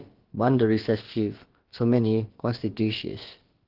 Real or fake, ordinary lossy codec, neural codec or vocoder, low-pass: fake; Opus, 16 kbps; codec, 16 kHz, about 1 kbps, DyCAST, with the encoder's durations; 5.4 kHz